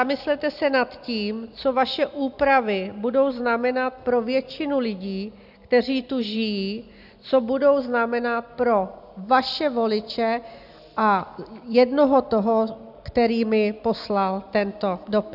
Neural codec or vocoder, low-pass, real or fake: none; 5.4 kHz; real